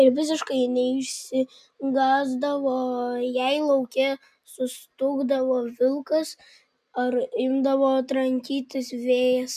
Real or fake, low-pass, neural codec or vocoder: real; 14.4 kHz; none